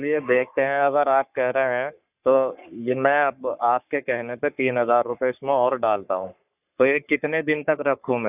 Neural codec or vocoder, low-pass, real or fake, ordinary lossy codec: codec, 44.1 kHz, 3.4 kbps, Pupu-Codec; 3.6 kHz; fake; none